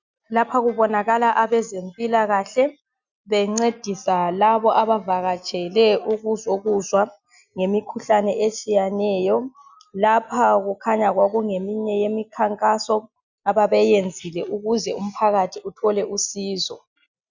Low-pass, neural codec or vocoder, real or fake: 7.2 kHz; none; real